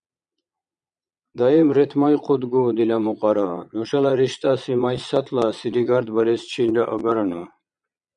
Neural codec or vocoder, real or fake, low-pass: vocoder, 22.05 kHz, 80 mel bands, Vocos; fake; 9.9 kHz